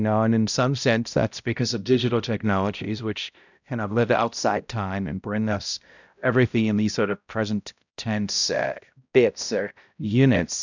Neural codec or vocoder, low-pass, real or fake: codec, 16 kHz, 0.5 kbps, X-Codec, HuBERT features, trained on balanced general audio; 7.2 kHz; fake